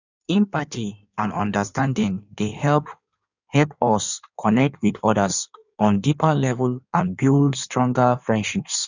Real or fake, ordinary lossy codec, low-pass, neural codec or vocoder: fake; none; 7.2 kHz; codec, 16 kHz in and 24 kHz out, 1.1 kbps, FireRedTTS-2 codec